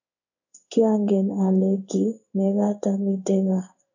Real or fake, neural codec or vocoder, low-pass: fake; codec, 16 kHz in and 24 kHz out, 1 kbps, XY-Tokenizer; 7.2 kHz